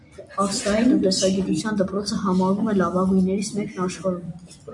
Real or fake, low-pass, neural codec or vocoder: real; 10.8 kHz; none